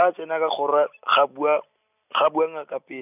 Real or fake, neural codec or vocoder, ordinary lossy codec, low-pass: real; none; none; 3.6 kHz